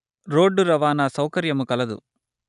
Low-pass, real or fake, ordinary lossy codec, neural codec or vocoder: 10.8 kHz; real; none; none